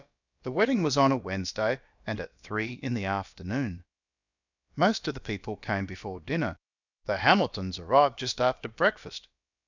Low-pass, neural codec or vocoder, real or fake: 7.2 kHz; codec, 16 kHz, about 1 kbps, DyCAST, with the encoder's durations; fake